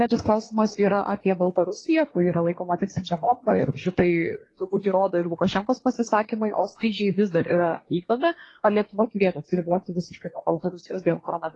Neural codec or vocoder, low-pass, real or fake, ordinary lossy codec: codec, 24 kHz, 1 kbps, SNAC; 10.8 kHz; fake; AAC, 32 kbps